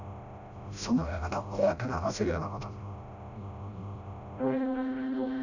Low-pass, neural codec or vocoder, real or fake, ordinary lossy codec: 7.2 kHz; codec, 16 kHz, 0.5 kbps, FreqCodec, smaller model; fake; none